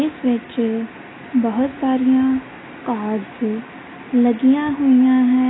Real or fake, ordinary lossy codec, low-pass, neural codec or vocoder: real; AAC, 16 kbps; 7.2 kHz; none